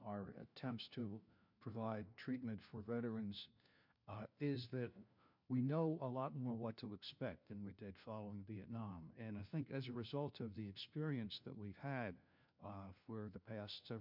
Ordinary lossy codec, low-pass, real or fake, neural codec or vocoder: MP3, 48 kbps; 5.4 kHz; fake; codec, 16 kHz, 1 kbps, FunCodec, trained on LibriTTS, 50 frames a second